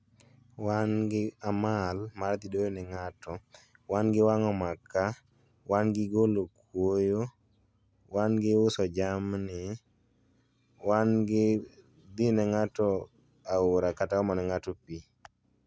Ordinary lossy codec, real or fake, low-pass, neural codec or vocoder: none; real; none; none